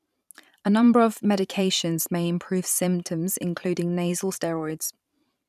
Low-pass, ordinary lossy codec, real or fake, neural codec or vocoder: 14.4 kHz; none; fake; vocoder, 44.1 kHz, 128 mel bands every 512 samples, BigVGAN v2